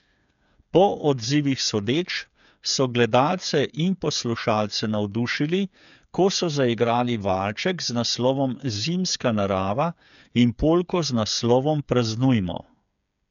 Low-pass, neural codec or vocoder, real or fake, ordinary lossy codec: 7.2 kHz; codec, 16 kHz, 8 kbps, FreqCodec, smaller model; fake; none